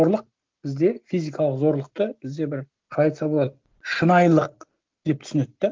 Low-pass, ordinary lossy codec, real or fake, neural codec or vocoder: 7.2 kHz; Opus, 32 kbps; real; none